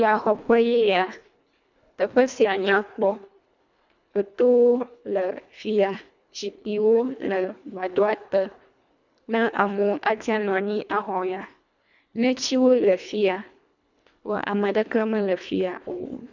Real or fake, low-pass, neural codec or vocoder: fake; 7.2 kHz; codec, 24 kHz, 1.5 kbps, HILCodec